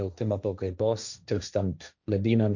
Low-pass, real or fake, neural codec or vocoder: 7.2 kHz; fake; codec, 16 kHz, 1.1 kbps, Voila-Tokenizer